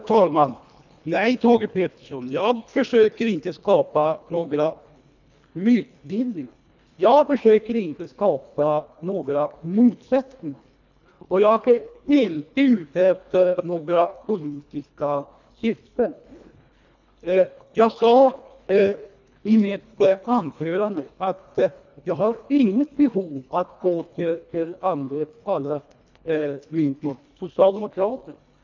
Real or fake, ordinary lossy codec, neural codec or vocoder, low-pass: fake; none; codec, 24 kHz, 1.5 kbps, HILCodec; 7.2 kHz